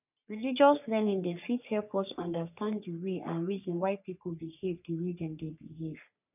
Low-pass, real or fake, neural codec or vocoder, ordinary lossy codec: 3.6 kHz; fake; codec, 44.1 kHz, 3.4 kbps, Pupu-Codec; none